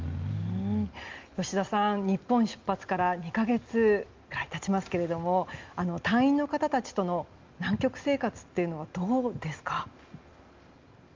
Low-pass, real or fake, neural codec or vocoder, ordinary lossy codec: 7.2 kHz; real; none; Opus, 32 kbps